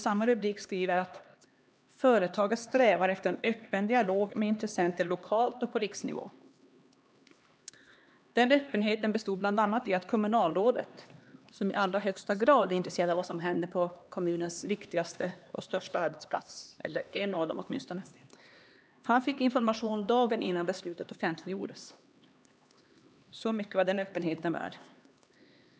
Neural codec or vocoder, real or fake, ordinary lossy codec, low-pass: codec, 16 kHz, 2 kbps, X-Codec, HuBERT features, trained on LibriSpeech; fake; none; none